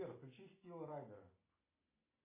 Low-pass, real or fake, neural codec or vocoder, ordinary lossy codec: 3.6 kHz; real; none; AAC, 24 kbps